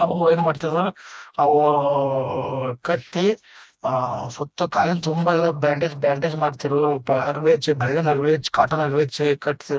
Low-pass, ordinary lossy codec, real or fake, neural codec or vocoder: none; none; fake; codec, 16 kHz, 1 kbps, FreqCodec, smaller model